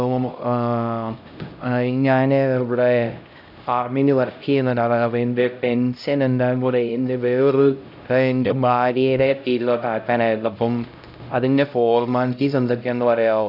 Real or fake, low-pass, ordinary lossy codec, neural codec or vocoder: fake; 5.4 kHz; AAC, 48 kbps; codec, 16 kHz, 0.5 kbps, X-Codec, HuBERT features, trained on LibriSpeech